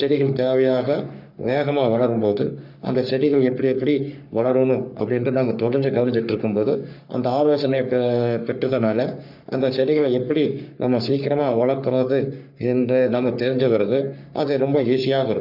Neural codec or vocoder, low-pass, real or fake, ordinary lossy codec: codec, 44.1 kHz, 3.4 kbps, Pupu-Codec; 5.4 kHz; fake; none